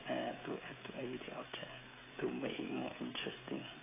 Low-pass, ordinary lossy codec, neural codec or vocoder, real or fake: 3.6 kHz; AAC, 16 kbps; codec, 16 kHz, 16 kbps, FreqCodec, smaller model; fake